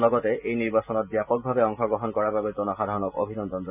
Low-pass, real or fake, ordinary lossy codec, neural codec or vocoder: 3.6 kHz; real; none; none